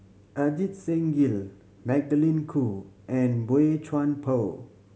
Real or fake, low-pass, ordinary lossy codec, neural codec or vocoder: real; none; none; none